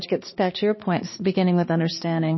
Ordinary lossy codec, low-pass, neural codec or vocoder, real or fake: MP3, 24 kbps; 7.2 kHz; codec, 16 kHz, 2 kbps, X-Codec, HuBERT features, trained on balanced general audio; fake